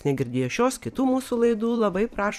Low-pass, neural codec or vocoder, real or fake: 14.4 kHz; vocoder, 44.1 kHz, 128 mel bands every 512 samples, BigVGAN v2; fake